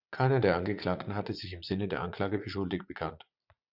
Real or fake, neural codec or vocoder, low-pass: fake; vocoder, 44.1 kHz, 80 mel bands, Vocos; 5.4 kHz